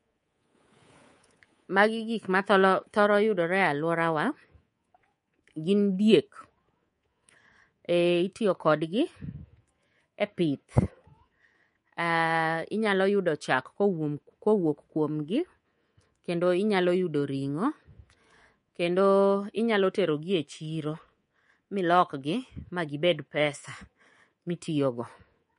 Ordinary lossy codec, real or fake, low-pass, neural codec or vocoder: MP3, 48 kbps; fake; 10.8 kHz; codec, 24 kHz, 3.1 kbps, DualCodec